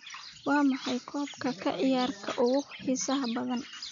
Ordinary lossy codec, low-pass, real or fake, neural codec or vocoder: none; 7.2 kHz; real; none